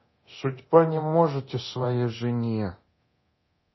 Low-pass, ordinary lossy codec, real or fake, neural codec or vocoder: 7.2 kHz; MP3, 24 kbps; fake; codec, 24 kHz, 0.9 kbps, DualCodec